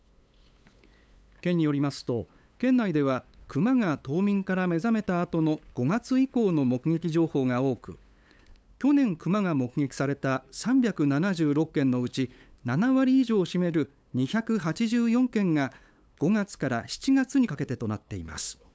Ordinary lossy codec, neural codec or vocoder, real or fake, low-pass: none; codec, 16 kHz, 8 kbps, FunCodec, trained on LibriTTS, 25 frames a second; fake; none